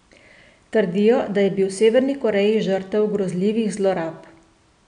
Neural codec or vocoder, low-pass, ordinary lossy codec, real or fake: none; 9.9 kHz; none; real